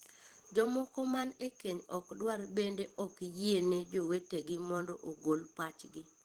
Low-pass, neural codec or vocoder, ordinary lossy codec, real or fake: 19.8 kHz; vocoder, 44.1 kHz, 128 mel bands every 512 samples, BigVGAN v2; Opus, 16 kbps; fake